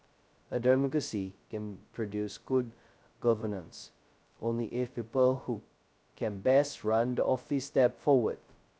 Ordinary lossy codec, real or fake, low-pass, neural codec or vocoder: none; fake; none; codec, 16 kHz, 0.2 kbps, FocalCodec